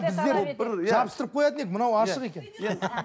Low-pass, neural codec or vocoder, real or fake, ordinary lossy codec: none; none; real; none